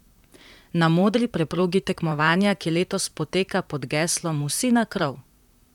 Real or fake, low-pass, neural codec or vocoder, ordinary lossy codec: fake; 19.8 kHz; vocoder, 44.1 kHz, 128 mel bands, Pupu-Vocoder; none